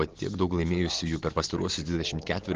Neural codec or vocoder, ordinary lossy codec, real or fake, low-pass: none; Opus, 16 kbps; real; 7.2 kHz